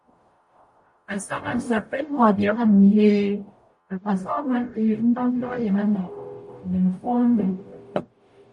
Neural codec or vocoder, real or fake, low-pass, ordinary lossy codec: codec, 44.1 kHz, 0.9 kbps, DAC; fake; 10.8 kHz; MP3, 48 kbps